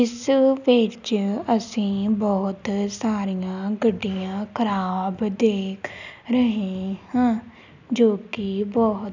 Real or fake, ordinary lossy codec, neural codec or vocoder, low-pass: real; none; none; 7.2 kHz